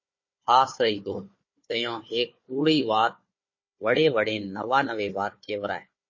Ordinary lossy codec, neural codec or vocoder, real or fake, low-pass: MP3, 32 kbps; codec, 16 kHz, 4 kbps, FunCodec, trained on Chinese and English, 50 frames a second; fake; 7.2 kHz